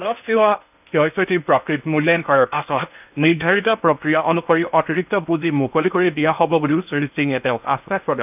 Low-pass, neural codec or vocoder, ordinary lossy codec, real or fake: 3.6 kHz; codec, 16 kHz in and 24 kHz out, 0.6 kbps, FocalCodec, streaming, 2048 codes; none; fake